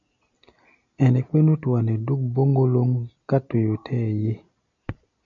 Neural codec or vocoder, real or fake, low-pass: none; real; 7.2 kHz